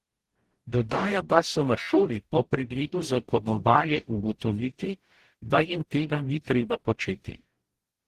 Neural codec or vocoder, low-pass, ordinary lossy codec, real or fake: codec, 44.1 kHz, 0.9 kbps, DAC; 14.4 kHz; Opus, 16 kbps; fake